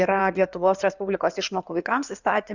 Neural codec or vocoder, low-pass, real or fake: codec, 16 kHz in and 24 kHz out, 2.2 kbps, FireRedTTS-2 codec; 7.2 kHz; fake